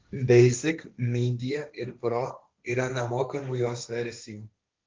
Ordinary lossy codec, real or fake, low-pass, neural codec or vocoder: Opus, 32 kbps; fake; 7.2 kHz; codec, 16 kHz, 1.1 kbps, Voila-Tokenizer